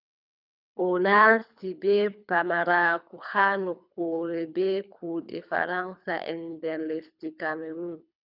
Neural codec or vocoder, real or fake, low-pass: codec, 24 kHz, 3 kbps, HILCodec; fake; 5.4 kHz